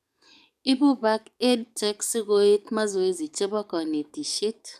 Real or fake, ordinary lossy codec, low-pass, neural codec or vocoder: fake; none; 14.4 kHz; codec, 44.1 kHz, 7.8 kbps, DAC